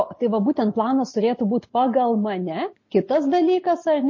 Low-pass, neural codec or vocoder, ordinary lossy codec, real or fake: 7.2 kHz; none; MP3, 32 kbps; real